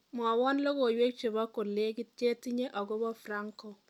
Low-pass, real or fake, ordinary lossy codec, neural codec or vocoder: 19.8 kHz; real; none; none